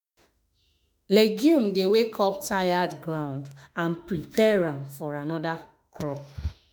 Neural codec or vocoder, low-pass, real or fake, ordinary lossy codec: autoencoder, 48 kHz, 32 numbers a frame, DAC-VAE, trained on Japanese speech; none; fake; none